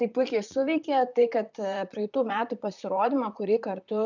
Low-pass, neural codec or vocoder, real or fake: 7.2 kHz; vocoder, 44.1 kHz, 128 mel bands, Pupu-Vocoder; fake